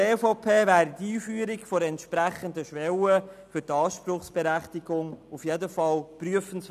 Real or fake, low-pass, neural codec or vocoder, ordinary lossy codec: real; 14.4 kHz; none; none